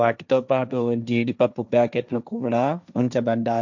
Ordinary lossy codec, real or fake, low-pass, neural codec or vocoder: none; fake; 7.2 kHz; codec, 16 kHz, 1.1 kbps, Voila-Tokenizer